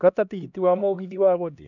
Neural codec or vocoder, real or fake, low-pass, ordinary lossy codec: codec, 16 kHz, 1 kbps, X-Codec, HuBERT features, trained on LibriSpeech; fake; 7.2 kHz; none